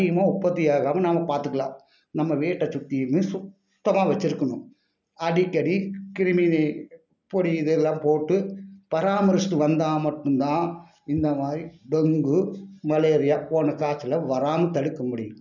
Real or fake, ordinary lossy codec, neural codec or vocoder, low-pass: real; none; none; 7.2 kHz